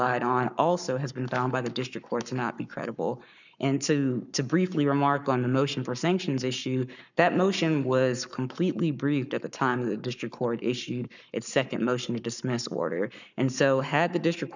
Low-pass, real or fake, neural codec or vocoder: 7.2 kHz; fake; codec, 44.1 kHz, 7.8 kbps, Pupu-Codec